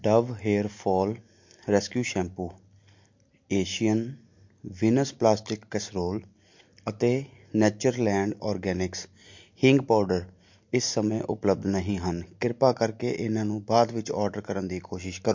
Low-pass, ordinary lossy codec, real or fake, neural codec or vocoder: 7.2 kHz; MP3, 48 kbps; real; none